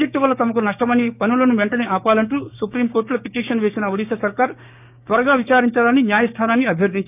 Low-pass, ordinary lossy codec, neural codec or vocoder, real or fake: 3.6 kHz; none; codec, 16 kHz, 6 kbps, DAC; fake